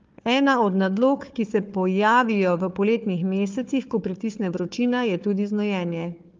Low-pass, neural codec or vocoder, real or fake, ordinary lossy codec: 7.2 kHz; codec, 16 kHz, 4 kbps, FunCodec, trained on Chinese and English, 50 frames a second; fake; Opus, 32 kbps